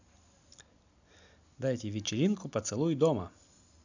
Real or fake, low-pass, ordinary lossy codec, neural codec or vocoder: real; 7.2 kHz; none; none